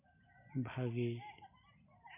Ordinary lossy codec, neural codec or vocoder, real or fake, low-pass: MP3, 32 kbps; none; real; 3.6 kHz